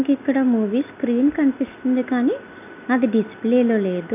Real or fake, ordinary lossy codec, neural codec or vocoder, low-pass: real; none; none; 3.6 kHz